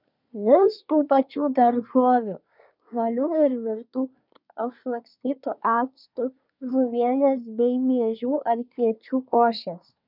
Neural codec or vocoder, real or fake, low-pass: codec, 24 kHz, 1 kbps, SNAC; fake; 5.4 kHz